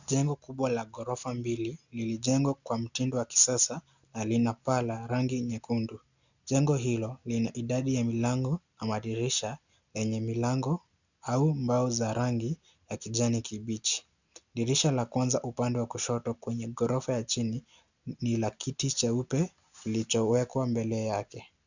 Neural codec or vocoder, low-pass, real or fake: none; 7.2 kHz; real